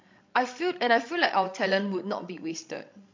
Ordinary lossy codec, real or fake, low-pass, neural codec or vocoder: MP3, 48 kbps; fake; 7.2 kHz; codec, 16 kHz, 16 kbps, FreqCodec, larger model